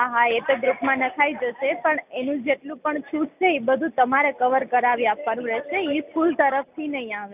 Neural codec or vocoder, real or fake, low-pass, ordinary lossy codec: none; real; 3.6 kHz; none